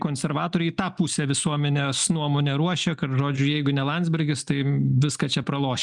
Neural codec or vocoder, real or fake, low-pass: none; real; 10.8 kHz